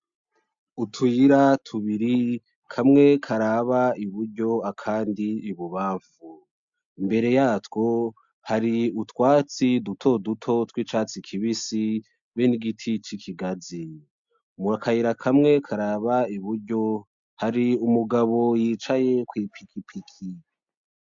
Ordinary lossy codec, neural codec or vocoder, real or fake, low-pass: MP3, 64 kbps; none; real; 7.2 kHz